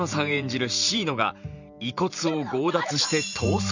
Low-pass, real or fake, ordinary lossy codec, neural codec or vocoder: 7.2 kHz; fake; none; vocoder, 44.1 kHz, 128 mel bands every 512 samples, BigVGAN v2